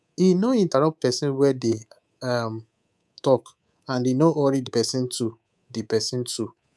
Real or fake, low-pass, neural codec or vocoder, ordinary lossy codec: fake; none; codec, 24 kHz, 3.1 kbps, DualCodec; none